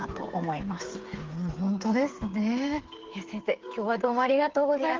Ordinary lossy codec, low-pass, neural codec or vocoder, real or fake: Opus, 16 kbps; 7.2 kHz; codec, 16 kHz, 8 kbps, FreqCodec, smaller model; fake